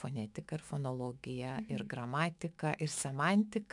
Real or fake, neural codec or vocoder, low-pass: fake; autoencoder, 48 kHz, 128 numbers a frame, DAC-VAE, trained on Japanese speech; 10.8 kHz